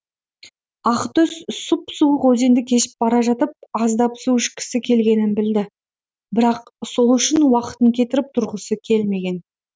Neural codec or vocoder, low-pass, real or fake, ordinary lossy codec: none; none; real; none